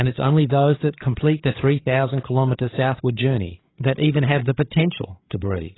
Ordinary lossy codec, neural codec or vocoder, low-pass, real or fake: AAC, 16 kbps; codec, 16 kHz, 8 kbps, FreqCodec, larger model; 7.2 kHz; fake